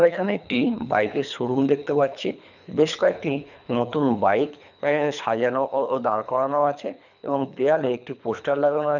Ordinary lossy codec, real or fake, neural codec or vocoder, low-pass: none; fake; codec, 24 kHz, 3 kbps, HILCodec; 7.2 kHz